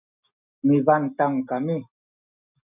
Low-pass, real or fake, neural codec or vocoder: 3.6 kHz; real; none